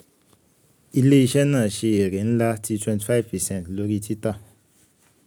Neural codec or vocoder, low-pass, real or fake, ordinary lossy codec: none; none; real; none